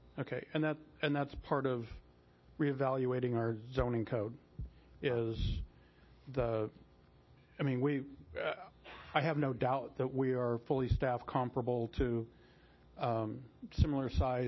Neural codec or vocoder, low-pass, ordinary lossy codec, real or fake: none; 7.2 kHz; MP3, 24 kbps; real